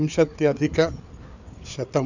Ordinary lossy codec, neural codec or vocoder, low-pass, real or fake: none; codec, 16 kHz, 4 kbps, FreqCodec, larger model; 7.2 kHz; fake